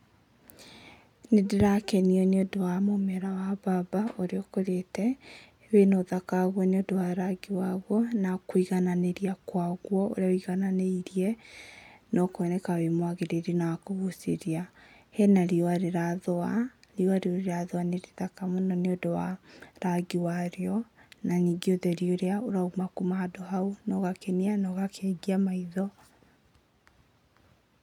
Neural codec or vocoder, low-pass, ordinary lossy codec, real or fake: none; 19.8 kHz; none; real